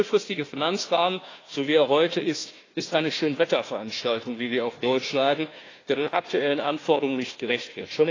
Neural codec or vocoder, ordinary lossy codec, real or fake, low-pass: codec, 16 kHz, 1 kbps, FunCodec, trained on Chinese and English, 50 frames a second; AAC, 32 kbps; fake; 7.2 kHz